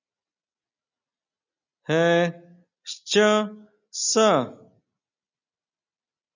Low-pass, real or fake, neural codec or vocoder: 7.2 kHz; real; none